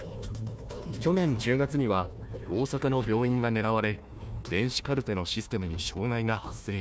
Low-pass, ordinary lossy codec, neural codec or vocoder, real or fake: none; none; codec, 16 kHz, 1 kbps, FunCodec, trained on Chinese and English, 50 frames a second; fake